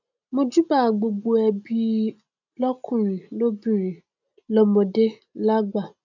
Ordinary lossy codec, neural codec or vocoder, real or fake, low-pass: none; none; real; 7.2 kHz